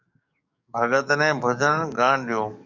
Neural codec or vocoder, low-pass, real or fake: codec, 44.1 kHz, 7.8 kbps, DAC; 7.2 kHz; fake